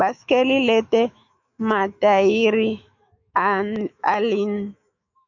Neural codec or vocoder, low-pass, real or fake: autoencoder, 48 kHz, 128 numbers a frame, DAC-VAE, trained on Japanese speech; 7.2 kHz; fake